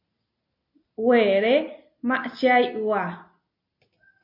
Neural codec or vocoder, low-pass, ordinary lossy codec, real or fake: none; 5.4 kHz; MP3, 32 kbps; real